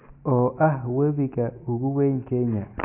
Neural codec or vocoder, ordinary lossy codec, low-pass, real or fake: none; MP3, 32 kbps; 3.6 kHz; real